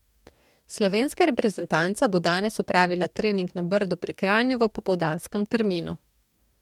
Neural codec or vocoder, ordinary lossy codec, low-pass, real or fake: codec, 44.1 kHz, 2.6 kbps, DAC; MP3, 96 kbps; 19.8 kHz; fake